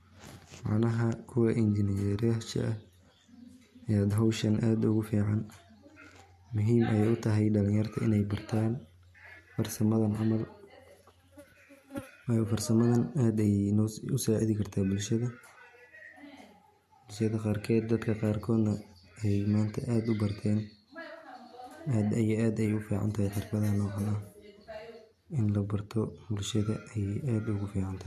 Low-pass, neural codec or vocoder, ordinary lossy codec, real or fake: 14.4 kHz; none; MP3, 64 kbps; real